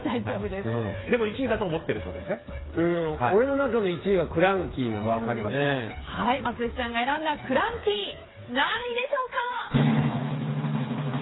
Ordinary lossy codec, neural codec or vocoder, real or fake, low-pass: AAC, 16 kbps; codec, 16 kHz, 4 kbps, FreqCodec, smaller model; fake; 7.2 kHz